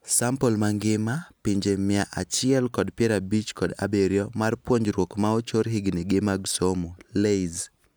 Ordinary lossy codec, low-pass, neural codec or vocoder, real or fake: none; none; none; real